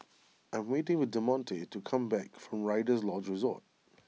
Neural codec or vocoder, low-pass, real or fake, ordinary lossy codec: none; none; real; none